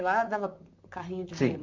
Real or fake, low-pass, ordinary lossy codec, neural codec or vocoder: fake; 7.2 kHz; MP3, 64 kbps; vocoder, 44.1 kHz, 128 mel bands, Pupu-Vocoder